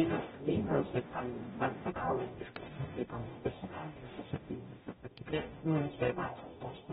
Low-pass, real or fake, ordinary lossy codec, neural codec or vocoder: 19.8 kHz; fake; AAC, 16 kbps; codec, 44.1 kHz, 0.9 kbps, DAC